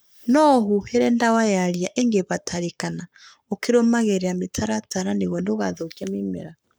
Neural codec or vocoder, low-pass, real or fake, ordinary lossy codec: codec, 44.1 kHz, 7.8 kbps, Pupu-Codec; none; fake; none